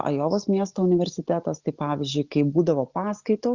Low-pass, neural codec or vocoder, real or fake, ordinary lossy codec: 7.2 kHz; none; real; Opus, 64 kbps